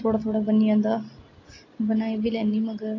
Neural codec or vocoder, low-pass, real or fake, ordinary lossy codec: none; 7.2 kHz; real; AAC, 32 kbps